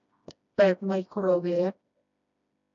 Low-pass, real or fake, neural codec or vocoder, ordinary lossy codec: 7.2 kHz; fake; codec, 16 kHz, 1 kbps, FreqCodec, smaller model; none